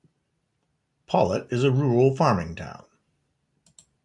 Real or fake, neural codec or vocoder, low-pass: real; none; 10.8 kHz